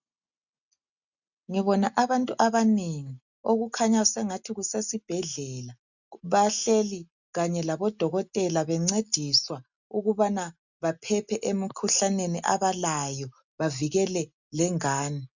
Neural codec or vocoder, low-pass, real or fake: none; 7.2 kHz; real